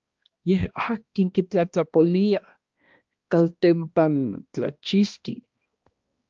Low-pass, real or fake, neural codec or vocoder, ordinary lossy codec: 7.2 kHz; fake; codec, 16 kHz, 1 kbps, X-Codec, HuBERT features, trained on balanced general audio; Opus, 24 kbps